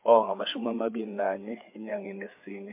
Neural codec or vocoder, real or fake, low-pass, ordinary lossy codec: codec, 16 kHz, 4 kbps, FreqCodec, larger model; fake; 3.6 kHz; MP3, 32 kbps